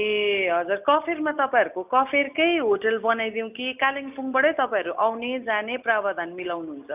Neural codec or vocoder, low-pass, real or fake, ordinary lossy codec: none; 3.6 kHz; real; none